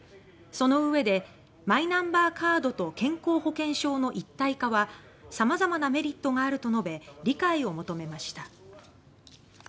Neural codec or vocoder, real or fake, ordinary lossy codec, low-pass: none; real; none; none